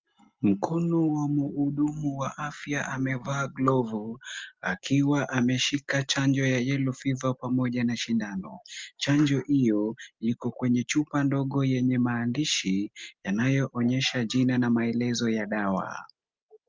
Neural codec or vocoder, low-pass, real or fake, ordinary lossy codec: none; 7.2 kHz; real; Opus, 24 kbps